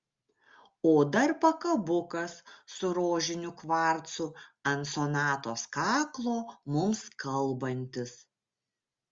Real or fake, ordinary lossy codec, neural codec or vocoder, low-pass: real; Opus, 32 kbps; none; 7.2 kHz